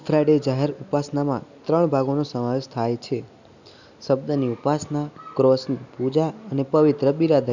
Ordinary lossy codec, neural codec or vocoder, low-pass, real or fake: none; none; 7.2 kHz; real